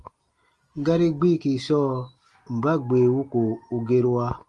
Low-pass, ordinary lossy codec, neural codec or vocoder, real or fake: 10.8 kHz; Opus, 24 kbps; none; real